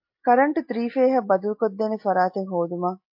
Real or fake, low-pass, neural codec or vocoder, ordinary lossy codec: real; 5.4 kHz; none; MP3, 32 kbps